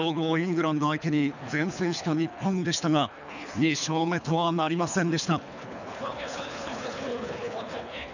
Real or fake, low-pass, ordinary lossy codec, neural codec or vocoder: fake; 7.2 kHz; none; codec, 24 kHz, 3 kbps, HILCodec